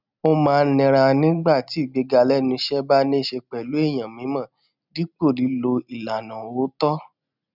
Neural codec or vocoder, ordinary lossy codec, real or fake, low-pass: none; none; real; 5.4 kHz